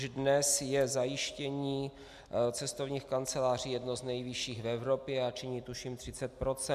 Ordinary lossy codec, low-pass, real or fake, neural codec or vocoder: MP3, 96 kbps; 14.4 kHz; real; none